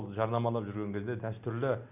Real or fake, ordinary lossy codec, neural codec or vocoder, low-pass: real; none; none; 3.6 kHz